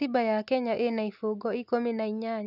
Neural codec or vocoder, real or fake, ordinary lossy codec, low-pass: none; real; none; 5.4 kHz